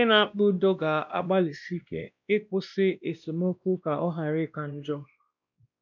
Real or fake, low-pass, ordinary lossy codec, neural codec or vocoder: fake; 7.2 kHz; none; codec, 16 kHz, 1 kbps, X-Codec, WavLM features, trained on Multilingual LibriSpeech